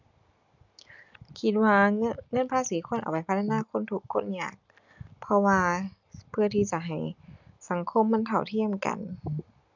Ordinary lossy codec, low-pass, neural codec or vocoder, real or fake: none; 7.2 kHz; none; real